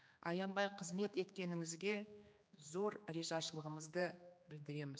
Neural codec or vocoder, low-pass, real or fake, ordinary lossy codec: codec, 16 kHz, 2 kbps, X-Codec, HuBERT features, trained on general audio; none; fake; none